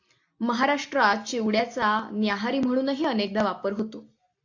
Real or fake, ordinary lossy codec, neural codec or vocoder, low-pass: real; AAC, 48 kbps; none; 7.2 kHz